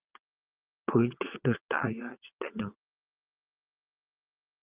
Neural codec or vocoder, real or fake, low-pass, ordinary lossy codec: vocoder, 22.05 kHz, 80 mel bands, WaveNeXt; fake; 3.6 kHz; Opus, 32 kbps